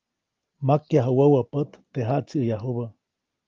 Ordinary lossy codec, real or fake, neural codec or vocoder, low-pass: Opus, 32 kbps; real; none; 7.2 kHz